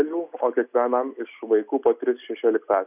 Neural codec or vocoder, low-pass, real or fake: none; 3.6 kHz; real